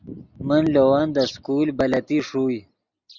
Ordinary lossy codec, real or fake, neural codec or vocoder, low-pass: Opus, 64 kbps; real; none; 7.2 kHz